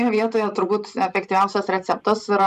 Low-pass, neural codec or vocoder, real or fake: 14.4 kHz; vocoder, 44.1 kHz, 128 mel bands every 512 samples, BigVGAN v2; fake